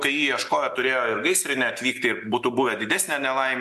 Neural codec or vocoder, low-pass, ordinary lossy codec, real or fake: none; 14.4 kHz; AAC, 96 kbps; real